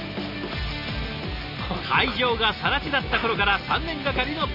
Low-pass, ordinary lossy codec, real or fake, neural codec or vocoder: 5.4 kHz; none; real; none